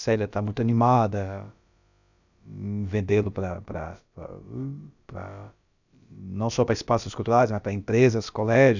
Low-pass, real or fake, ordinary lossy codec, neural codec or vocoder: 7.2 kHz; fake; none; codec, 16 kHz, about 1 kbps, DyCAST, with the encoder's durations